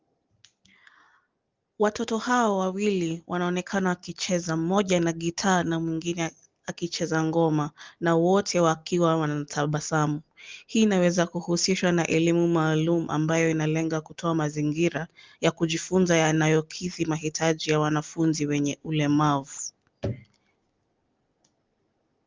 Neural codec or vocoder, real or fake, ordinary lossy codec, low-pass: none; real; Opus, 32 kbps; 7.2 kHz